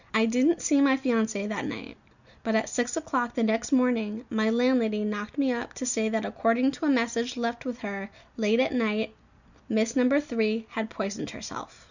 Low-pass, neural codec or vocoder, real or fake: 7.2 kHz; none; real